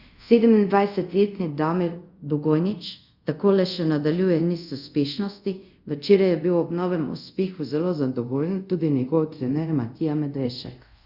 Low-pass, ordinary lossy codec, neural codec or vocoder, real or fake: 5.4 kHz; Opus, 64 kbps; codec, 24 kHz, 0.5 kbps, DualCodec; fake